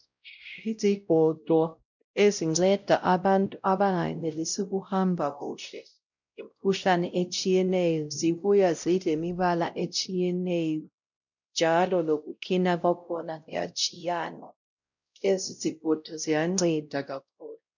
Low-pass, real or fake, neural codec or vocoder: 7.2 kHz; fake; codec, 16 kHz, 0.5 kbps, X-Codec, WavLM features, trained on Multilingual LibriSpeech